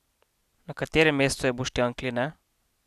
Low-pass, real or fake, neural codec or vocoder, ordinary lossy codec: 14.4 kHz; real; none; none